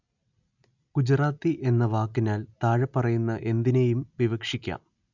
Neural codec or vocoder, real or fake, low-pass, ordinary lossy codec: none; real; 7.2 kHz; none